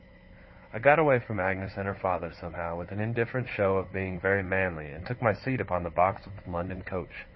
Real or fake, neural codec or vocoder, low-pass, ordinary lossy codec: fake; codec, 16 kHz, 4 kbps, FunCodec, trained on Chinese and English, 50 frames a second; 7.2 kHz; MP3, 24 kbps